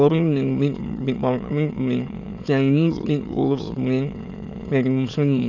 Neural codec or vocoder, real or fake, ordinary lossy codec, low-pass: autoencoder, 22.05 kHz, a latent of 192 numbers a frame, VITS, trained on many speakers; fake; none; 7.2 kHz